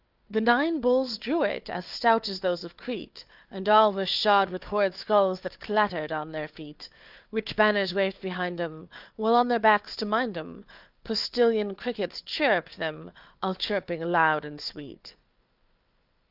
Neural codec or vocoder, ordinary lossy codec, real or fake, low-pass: codec, 16 kHz, 4 kbps, FunCodec, trained on Chinese and English, 50 frames a second; Opus, 24 kbps; fake; 5.4 kHz